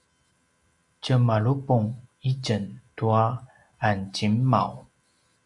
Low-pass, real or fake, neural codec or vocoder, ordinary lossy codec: 10.8 kHz; real; none; Opus, 64 kbps